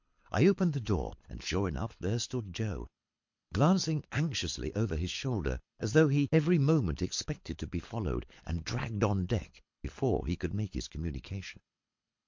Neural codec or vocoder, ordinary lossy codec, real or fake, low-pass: codec, 24 kHz, 6 kbps, HILCodec; MP3, 48 kbps; fake; 7.2 kHz